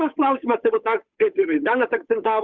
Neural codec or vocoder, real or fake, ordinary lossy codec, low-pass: codec, 16 kHz, 8 kbps, FunCodec, trained on Chinese and English, 25 frames a second; fake; Opus, 64 kbps; 7.2 kHz